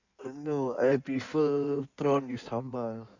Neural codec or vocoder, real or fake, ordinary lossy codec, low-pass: codec, 16 kHz in and 24 kHz out, 1.1 kbps, FireRedTTS-2 codec; fake; none; 7.2 kHz